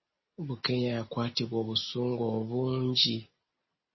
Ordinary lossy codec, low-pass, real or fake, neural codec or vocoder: MP3, 24 kbps; 7.2 kHz; real; none